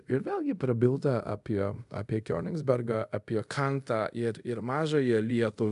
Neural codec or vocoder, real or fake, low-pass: codec, 24 kHz, 0.5 kbps, DualCodec; fake; 10.8 kHz